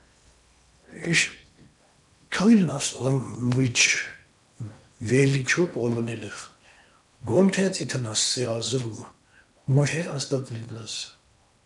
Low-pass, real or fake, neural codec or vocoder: 10.8 kHz; fake; codec, 16 kHz in and 24 kHz out, 0.8 kbps, FocalCodec, streaming, 65536 codes